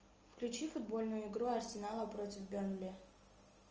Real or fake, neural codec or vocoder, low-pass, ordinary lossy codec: real; none; 7.2 kHz; Opus, 24 kbps